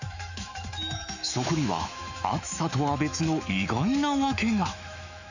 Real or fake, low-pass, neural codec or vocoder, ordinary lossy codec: fake; 7.2 kHz; autoencoder, 48 kHz, 128 numbers a frame, DAC-VAE, trained on Japanese speech; none